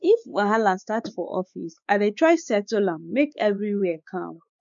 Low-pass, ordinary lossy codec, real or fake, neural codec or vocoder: 7.2 kHz; none; fake; codec, 16 kHz, 4 kbps, X-Codec, WavLM features, trained on Multilingual LibriSpeech